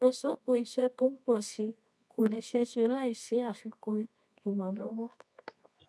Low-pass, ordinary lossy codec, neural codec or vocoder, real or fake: none; none; codec, 24 kHz, 0.9 kbps, WavTokenizer, medium music audio release; fake